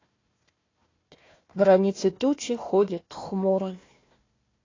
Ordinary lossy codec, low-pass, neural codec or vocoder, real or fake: AAC, 32 kbps; 7.2 kHz; codec, 16 kHz, 1 kbps, FunCodec, trained on Chinese and English, 50 frames a second; fake